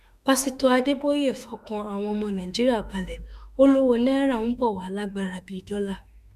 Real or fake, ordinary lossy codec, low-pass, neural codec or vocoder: fake; none; 14.4 kHz; autoencoder, 48 kHz, 32 numbers a frame, DAC-VAE, trained on Japanese speech